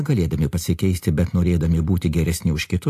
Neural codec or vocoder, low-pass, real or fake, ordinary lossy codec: none; 14.4 kHz; real; AAC, 64 kbps